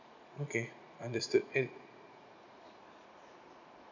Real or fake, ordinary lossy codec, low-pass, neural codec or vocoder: fake; none; 7.2 kHz; vocoder, 44.1 kHz, 80 mel bands, Vocos